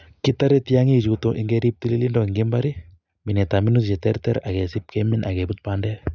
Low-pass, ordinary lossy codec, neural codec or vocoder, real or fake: 7.2 kHz; none; none; real